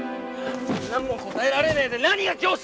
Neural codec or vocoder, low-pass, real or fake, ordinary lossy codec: none; none; real; none